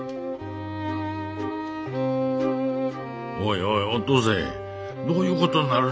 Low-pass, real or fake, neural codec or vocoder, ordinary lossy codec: none; real; none; none